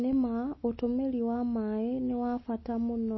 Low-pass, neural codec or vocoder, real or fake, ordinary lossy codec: 7.2 kHz; none; real; MP3, 24 kbps